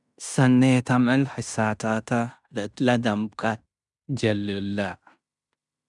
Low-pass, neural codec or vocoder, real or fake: 10.8 kHz; codec, 16 kHz in and 24 kHz out, 0.9 kbps, LongCat-Audio-Codec, fine tuned four codebook decoder; fake